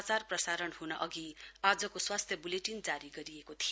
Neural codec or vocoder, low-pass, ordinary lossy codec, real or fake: none; none; none; real